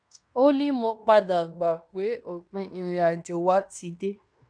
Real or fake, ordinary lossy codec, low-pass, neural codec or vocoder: fake; MP3, 96 kbps; 9.9 kHz; codec, 16 kHz in and 24 kHz out, 0.9 kbps, LongCat-Audio-Codec, fine tuned four codebook decoder